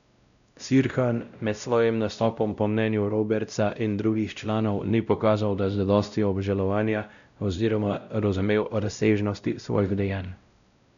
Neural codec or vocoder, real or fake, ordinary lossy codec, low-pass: codec, 16 kHz, 0.5 kbps, X-Codec, WavLM features, trained on Multilingual LibriSpeech; fake; none; 7.2 kHz